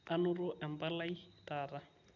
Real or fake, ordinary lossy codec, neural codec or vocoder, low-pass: real; none; none; 7.2 kHz